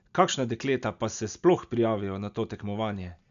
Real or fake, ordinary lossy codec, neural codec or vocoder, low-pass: real; none; none; 7.2 kHz